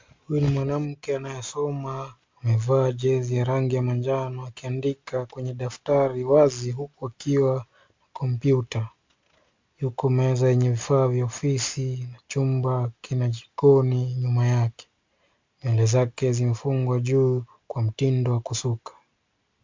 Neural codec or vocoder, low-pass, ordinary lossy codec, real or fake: none; 7.2 kHz; MP3, 64 kbps; real